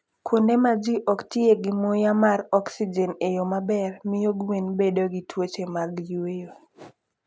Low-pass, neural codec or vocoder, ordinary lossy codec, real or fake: none; none; none; real